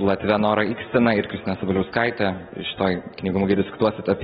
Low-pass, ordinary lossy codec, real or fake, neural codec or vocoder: 19.8 kHz; AAC, 16 kbps; real; none